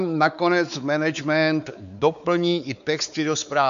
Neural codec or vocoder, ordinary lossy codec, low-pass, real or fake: codec, 16 kHz, 4 kbps, X-Codec, WavLM features, trained on Multilingual LibriSpeech; AAC, 96 kbps; 7.2 kHz; fake